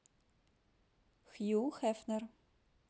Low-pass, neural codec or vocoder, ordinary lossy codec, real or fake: none; none; none; real